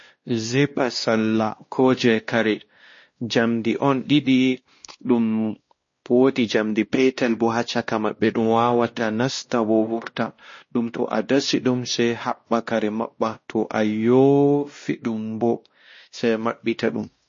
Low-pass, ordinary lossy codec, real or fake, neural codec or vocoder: 7.2 kHz; MP3, 32 kbps; fake; codec, 16 kHz, 1 kbps, X-Codec, WavLM features, trained on Multilingual LibriSpeech